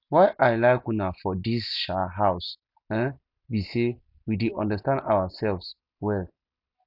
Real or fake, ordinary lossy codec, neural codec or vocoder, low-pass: real; none; none; 5.4 kHz